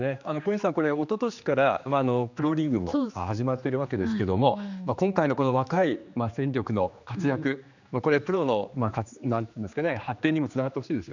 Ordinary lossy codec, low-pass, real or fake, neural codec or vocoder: none; 7.2 kHz; fake; codec, 16 kHz, 2 kbps, X-Codec, HuBERT features, trained on general audio